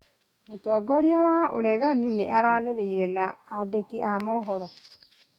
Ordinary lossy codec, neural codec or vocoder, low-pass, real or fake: none; codec, 44.1 kHz, 2.6 kbps, DAC; 19.8 kHz; fake